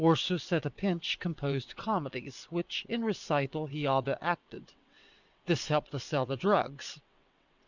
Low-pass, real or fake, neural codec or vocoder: 7.2 kHz; fake; vocoder, 22.05 kHz, 80 mel bands, WaveNeXt